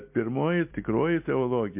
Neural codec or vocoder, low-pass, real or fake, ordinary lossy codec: none; 3.6 kHz; real; MP3, 32 kbps